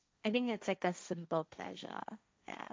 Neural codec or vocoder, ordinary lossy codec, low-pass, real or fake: codec, 16 kHz, 1.1 kbps, Voila-Tokenizer; none; none; fake